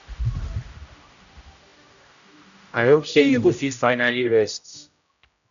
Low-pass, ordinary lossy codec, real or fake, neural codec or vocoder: 7.2 kHz; none; fake; codec, 16 kHz, 0.5 kbps, X-Codec, HuBERT features, trained on general audio